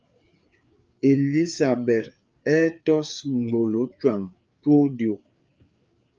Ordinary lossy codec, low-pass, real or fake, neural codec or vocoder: Opus, 24 kbps; 7.2 kHz; fake; codec, 16 kHz, 4 kbps, FreqCodec, larger model